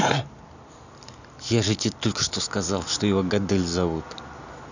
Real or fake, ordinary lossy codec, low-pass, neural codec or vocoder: real; none; 7.2 kHz; none